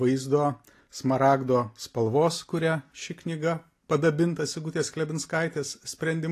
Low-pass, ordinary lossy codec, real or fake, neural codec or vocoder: 14.4 kHz; AAC, 48 kbps; real; none